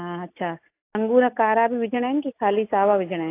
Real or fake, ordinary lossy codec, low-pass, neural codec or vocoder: real; AAC, 24 kbps; 3.6 kHz; none